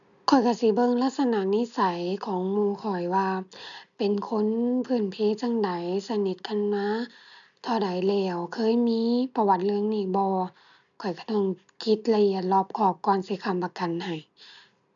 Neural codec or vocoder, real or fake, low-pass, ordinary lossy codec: none; real; 7.2 kHz; none